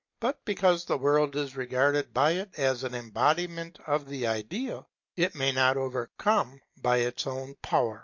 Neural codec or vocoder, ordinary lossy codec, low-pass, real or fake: none; MP3, 48 kbps; 7.2 kHz; real